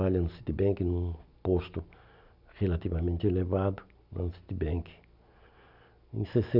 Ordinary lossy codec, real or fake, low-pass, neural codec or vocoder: none; real; 5.4 kHz; none